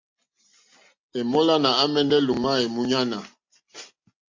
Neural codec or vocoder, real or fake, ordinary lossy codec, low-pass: none; real; MP3, 48 kbps; 7.2 kHz